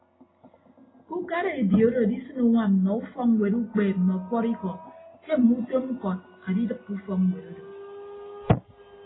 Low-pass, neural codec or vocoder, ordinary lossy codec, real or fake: 7.2 kHz; none; AAC, 16 kbps; real